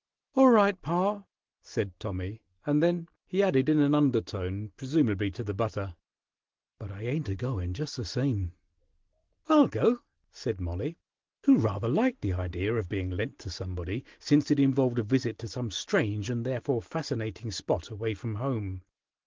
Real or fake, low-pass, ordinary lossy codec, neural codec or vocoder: real; 7.2 kHz; Opus, 16 kbps; none